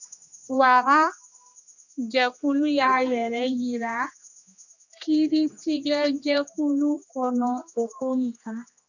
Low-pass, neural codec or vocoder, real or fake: 7.2 kHz; codec, 16 kHz, 2 kbps, X-Codec, HuBERT features, trained on general audio; fake